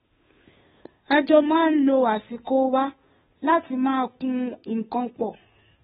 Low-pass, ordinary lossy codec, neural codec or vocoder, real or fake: 7.2 kHz; AAC, 16 kbps; codec, 16 kHz, 2 kbps, FunCodec, trained on Chinese and English, 25 frames a second; fake